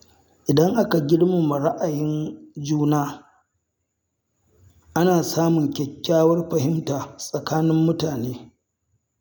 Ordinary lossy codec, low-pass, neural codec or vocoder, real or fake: none; 19.8 kHz; none; real